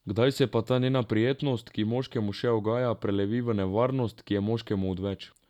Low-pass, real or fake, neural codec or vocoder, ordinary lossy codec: 19.8 kHz; real; none; none